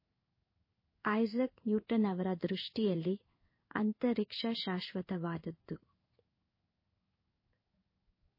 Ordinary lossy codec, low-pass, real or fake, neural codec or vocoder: MP3, 24 kbps; 5.4 kHz; fake; codec, 16 kHz in and 24 kHz out, 1 kbps, XY-Tokenizer